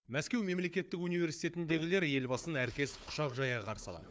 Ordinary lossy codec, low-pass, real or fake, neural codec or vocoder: none; none; fake; codec, 16 kHz, 4 kbps, FunCodec, trained on Chinese and English, 50 frames a second